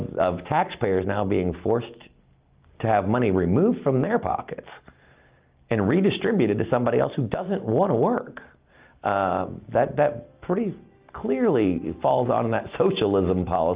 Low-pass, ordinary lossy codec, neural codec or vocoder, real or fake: 3.6 kHz; Opus, 32 kbps; none; real